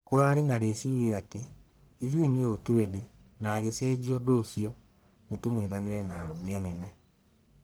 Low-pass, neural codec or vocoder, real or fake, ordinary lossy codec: none; codec, 44.1 kHz, 1.7 kbps, Pupu-Codec; fake; none